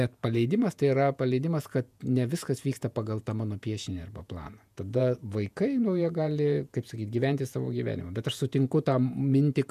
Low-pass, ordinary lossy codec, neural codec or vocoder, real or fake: 14.4 kHz; MP3, 96 kbps; vocoder, 48 kHz, 128 mel bands, Vocos; fake